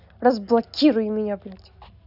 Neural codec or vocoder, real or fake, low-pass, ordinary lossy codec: none; real; 5.4 kHz; none